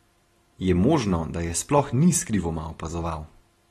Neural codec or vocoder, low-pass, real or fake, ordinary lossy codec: none; 19.8 kHz; real; AAC, 32 kbps